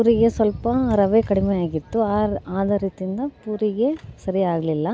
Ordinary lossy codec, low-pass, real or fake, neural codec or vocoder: none; none; real; none